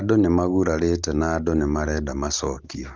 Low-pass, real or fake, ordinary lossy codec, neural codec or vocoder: 7.2 kHz; real; Opus, 24 kbps; none